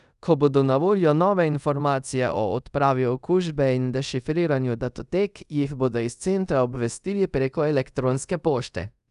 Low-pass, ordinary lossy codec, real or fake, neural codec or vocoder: 10.8 kHz; none; fake; codec, 24 kHz, 0.5 kbps, DualCodec